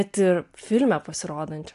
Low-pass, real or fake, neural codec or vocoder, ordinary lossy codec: 10.8 kHz; real; none; AAC, 64 kbps